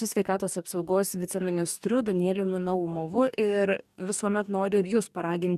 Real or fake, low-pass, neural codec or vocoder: fake; 14.4 kHz; codec, 44.1 kHz, 2.6 kbps, DAC